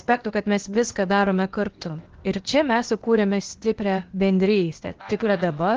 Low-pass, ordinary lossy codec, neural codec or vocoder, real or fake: 7.2 kHz; Opus, 16 kbps; codec, 16 kHz, 0.8 kbps, ZipCodec; fake